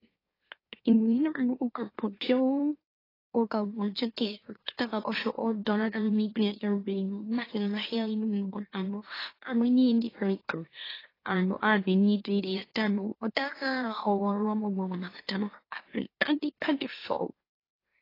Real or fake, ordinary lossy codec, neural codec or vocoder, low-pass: fake; AAC, 24 kbps; autoencoder, 44.1 kHz, a latent of 192 numbers a frame, MeloTTS; 5.4 kHz